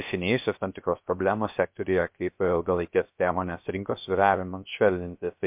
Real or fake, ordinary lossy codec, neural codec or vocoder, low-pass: fake; MP3, 32 kbps; codec, 16 kHz, 0.3 kbps, FocalCodec; 3.6 kHz